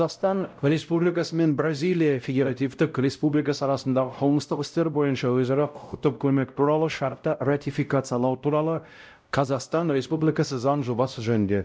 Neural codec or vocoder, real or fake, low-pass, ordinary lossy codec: codec, 16 kHz, 0.5 kbps, X-Codec, WavLM features, trained on Multilingual LibriSpeech; fake; none; none